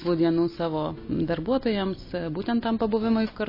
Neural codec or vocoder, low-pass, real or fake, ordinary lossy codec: none; 5.4 kHz; real; MP3, 24 kbps